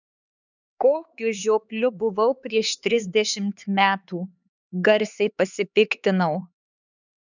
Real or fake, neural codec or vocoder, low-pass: fake; codec, 16 kHz, 4 kbps, X-Codec, HuBERT features, trained on LibriSpeech; 7.2 kHz